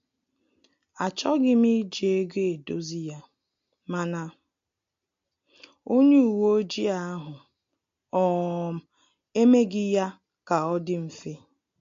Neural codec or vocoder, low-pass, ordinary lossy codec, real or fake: none; 7.2 kHz; MP3, 48 kbps; real